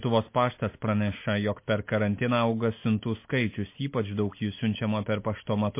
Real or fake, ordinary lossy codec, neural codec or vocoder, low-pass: real; MP3, 24 kbps; none; 3.6 kHz